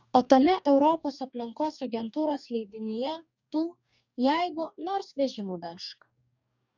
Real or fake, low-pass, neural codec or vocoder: fake; 7.2 kHz; codec, 44.1 kHz, 2.6 kbps, DAC